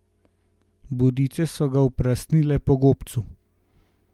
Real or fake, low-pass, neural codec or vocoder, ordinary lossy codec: real; 19.8 kHz; none; Opus, 32 kbps